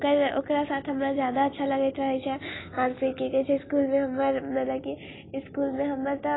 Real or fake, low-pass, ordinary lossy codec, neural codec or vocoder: real; 7.2 kHz; AAC, 16 kbps; none